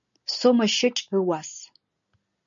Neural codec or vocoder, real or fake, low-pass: none; real; 7.2 kHz